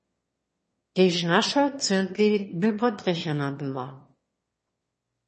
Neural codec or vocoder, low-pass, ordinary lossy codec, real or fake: autoencoder, 22.05 kHz, a latent of 192 numbers a frame, VITS, trained on one speaker; 9.9 kHz; MP3, 32 kbps; fake